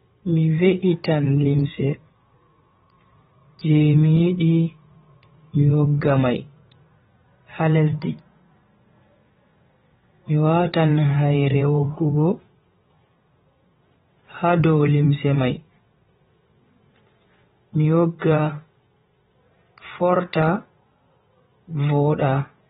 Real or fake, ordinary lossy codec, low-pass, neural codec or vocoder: fake; AAC, 16 kbps; 9.9 kHz; vocoder, 22.05 kHz, 80 mel bands, WaveNeXt